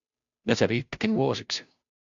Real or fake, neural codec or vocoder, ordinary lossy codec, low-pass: fake; codec, 16 kHz, 0.5 kbps, FunCodec, trained on Chinese and English, 25 frames a second; MP3, 96 kbps; 7.2 kHz